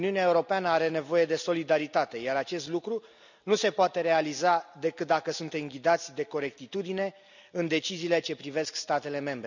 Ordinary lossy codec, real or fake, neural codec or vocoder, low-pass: none; real; none; 7.2 kHz